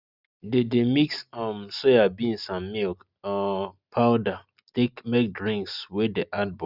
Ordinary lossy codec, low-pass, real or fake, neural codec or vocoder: Opus, 64 kbps; 5.4 kHz; real; none